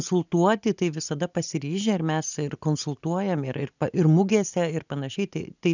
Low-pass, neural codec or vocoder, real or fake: 7.2 kHz; none; real